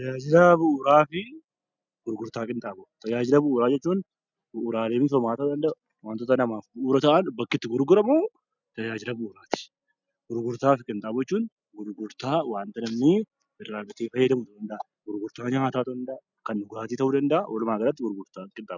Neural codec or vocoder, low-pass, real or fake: none; 7.2 kHz; real